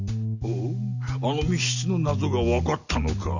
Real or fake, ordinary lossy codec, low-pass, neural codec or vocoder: real; none; 7.2 kHz; none